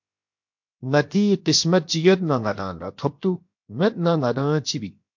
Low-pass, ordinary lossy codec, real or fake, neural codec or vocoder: 7.2 kHz; MP3, 48 kbps; fake; codec, 16 kHz, 0.7 kbps, FocalCodec